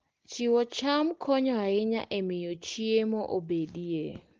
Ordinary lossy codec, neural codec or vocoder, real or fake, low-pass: Opus, 16 kbps; none; real; 7.2 kHz